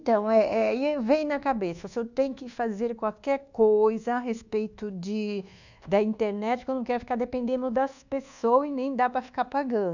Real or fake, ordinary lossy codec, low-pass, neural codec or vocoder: fake; none; 7.2 kHz; codec, 24 kHz, 1.2 kbps, DualCodec